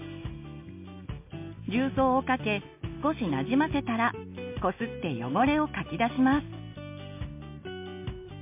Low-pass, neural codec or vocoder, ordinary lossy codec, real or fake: 3.6 kHz; none; MP3, 24 kbps; real